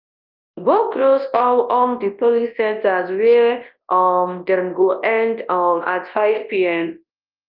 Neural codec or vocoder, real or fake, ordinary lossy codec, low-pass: codec, 24 kHz, 0.9 kbps, WavTokenizer, large speech release; fake; Opus, 32 kbps; 5.4 kHz